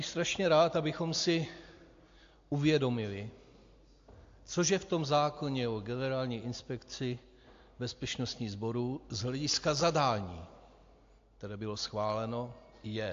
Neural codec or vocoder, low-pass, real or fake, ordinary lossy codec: none; 7.2 kHz; real; AAC, 48 kbps